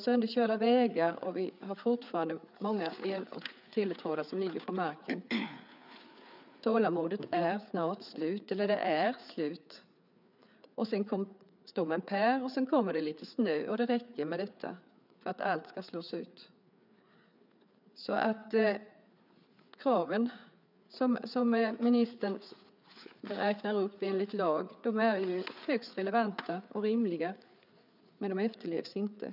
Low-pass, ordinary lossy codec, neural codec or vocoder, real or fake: 5.4 kHz; none; codec, 16 kHz, 4 kbps, FreqCodec, larger model; fake